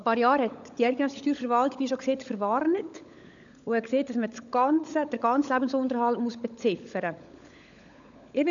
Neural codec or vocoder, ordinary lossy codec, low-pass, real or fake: codec, 16 kHz, 16 kbps, FunCodec, trained on LibriTTS, 50 frames a second; none; 7.2 kHz; fake